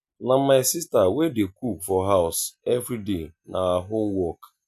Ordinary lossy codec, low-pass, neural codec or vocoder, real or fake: none; 14.4 kHz; none; real